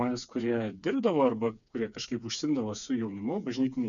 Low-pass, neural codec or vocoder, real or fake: 7.2 kHz; codec, 16 kHz, 4 kbps, FreqCodec, smaller model; fake